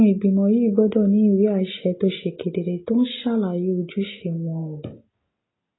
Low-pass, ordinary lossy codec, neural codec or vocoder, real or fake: 7.2 kHz; AAC, 16 kbps; none; real